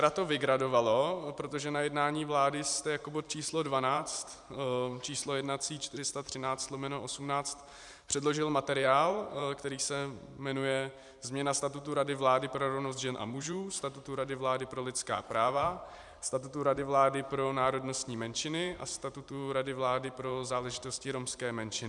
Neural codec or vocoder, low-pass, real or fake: vocoder, 44.1 kHz, 128 mel bands every 512 samples, BigVGAN v2; 10.8 kHz; fake